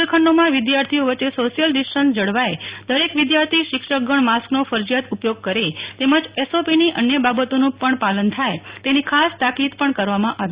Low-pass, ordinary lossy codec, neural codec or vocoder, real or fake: 3.6 kHz; Opus, 64 kbps; none; real